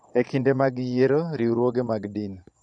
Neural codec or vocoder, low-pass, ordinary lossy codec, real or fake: vocoder, 22.05 kHz, 80 mel bands, Vocos; 9.9 kHz; none; fake